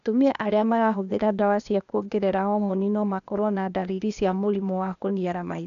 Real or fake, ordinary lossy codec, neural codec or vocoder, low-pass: fake; none; codec, 16 kHz, 0.8 kbps, ZipCodec; 7.2 kHz